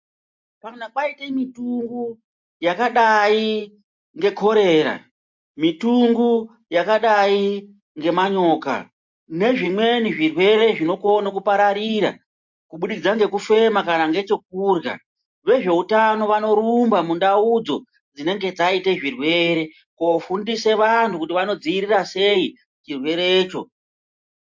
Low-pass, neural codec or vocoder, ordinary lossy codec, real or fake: 7.2 kHz; none; MP3, 48 kbps; real